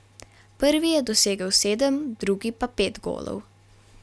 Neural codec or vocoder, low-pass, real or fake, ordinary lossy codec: none; none; real; none